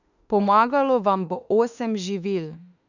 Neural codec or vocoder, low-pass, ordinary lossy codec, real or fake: autoencoder, 48 kHz, 32 numbers a frame, DAC-VAE, trained on Japanese speech; 7.2 kHz; none; fake